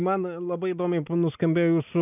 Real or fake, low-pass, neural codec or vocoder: real; 3.6 kHz; none